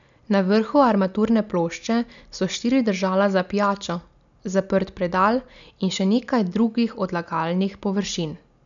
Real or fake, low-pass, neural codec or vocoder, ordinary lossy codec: real; 7.2 kHz; none; none